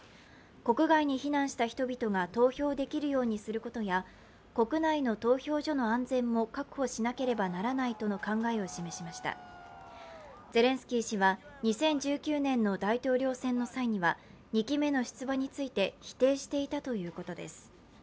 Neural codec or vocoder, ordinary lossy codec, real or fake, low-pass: none; none; real; none